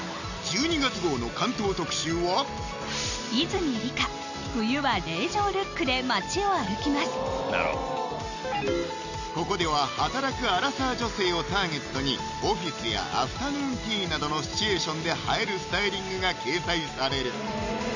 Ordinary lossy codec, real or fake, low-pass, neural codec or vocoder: none; real; 7.2 kHz; none